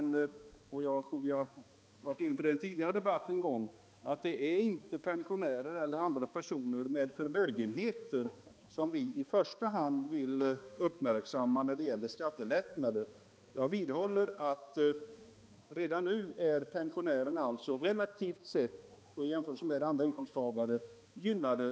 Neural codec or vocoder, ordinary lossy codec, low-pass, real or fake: codec, 16 kHz, 2 kbps, X-Codec, HuBERT features, trained on balanced general audio; none; none; fake